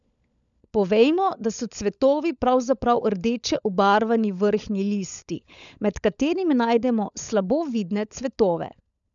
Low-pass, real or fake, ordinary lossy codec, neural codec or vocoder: 7.2 kHz; fake; none; codec, 16 kHz, 16 kbps, FunCodec, trained on LibriTTS, 50 frames a second